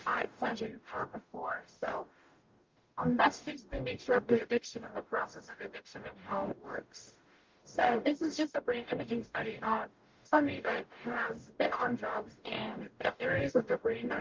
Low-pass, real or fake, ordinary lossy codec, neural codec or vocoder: 7.2 kHz; fake; Opus, 32 kbps; codec, 44.1 kHz, 0.9 kbps, DAC